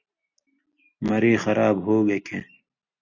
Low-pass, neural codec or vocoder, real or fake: 7.2 kHz; none; real